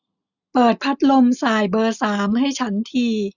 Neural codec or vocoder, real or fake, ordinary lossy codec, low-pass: none; real; none; 7.2 kHz